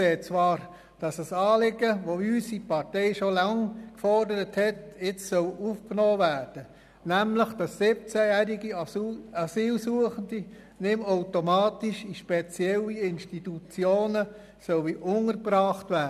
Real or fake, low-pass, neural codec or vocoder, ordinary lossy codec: real; 14.4 kHz; none; none